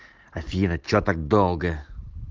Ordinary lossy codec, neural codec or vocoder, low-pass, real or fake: Opus, 16 kbps; none; 7.2 kHz; real